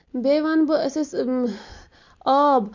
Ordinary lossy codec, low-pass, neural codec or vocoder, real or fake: Opus, 64 kbps; 7.2 kHz; none; real